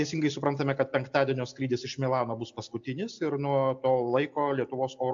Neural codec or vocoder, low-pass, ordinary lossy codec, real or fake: none; 7.2 kHz; AAC, 64 kbps; real